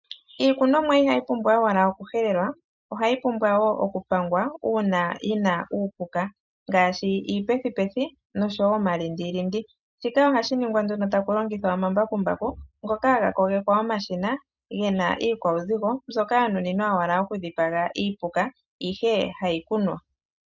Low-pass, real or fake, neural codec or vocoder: 7.2 kHz; real; none